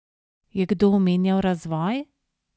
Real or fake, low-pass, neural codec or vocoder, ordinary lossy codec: real; none; none; none